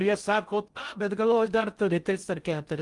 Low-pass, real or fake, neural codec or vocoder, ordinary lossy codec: 10.8 kHz; fake; codec, 16 kHz in and 24 kHz out, 0.6 kbps, FocalCodec, streaming, 2048 codes; Opus, 32 kbps